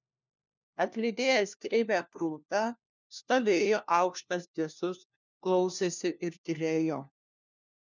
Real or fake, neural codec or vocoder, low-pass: fake; codec, 16 kHz, 1 kbps, FunCodec, trained on LibriTTS, 50 frames a second; 7.2 kHz